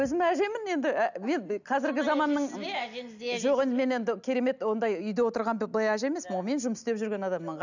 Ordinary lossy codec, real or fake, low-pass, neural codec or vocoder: none; real; 7.2 kHz; none